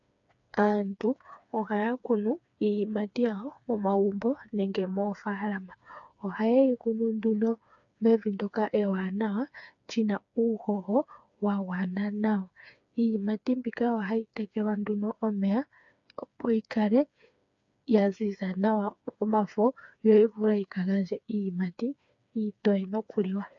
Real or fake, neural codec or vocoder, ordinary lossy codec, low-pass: fake; codec, 16 kHz, 4 kbps, FreqCodec, smaller model; AAC, 64 kbps; 7.2 kHz